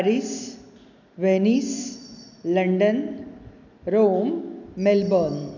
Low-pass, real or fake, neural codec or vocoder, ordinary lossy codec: 7.2 kHz; real; none; none